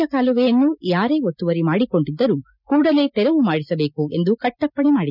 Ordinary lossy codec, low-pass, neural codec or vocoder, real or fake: none; 5.4 kHz; none; real